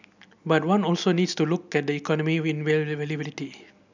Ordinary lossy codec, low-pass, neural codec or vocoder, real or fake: none; 7.2 kHz; none; real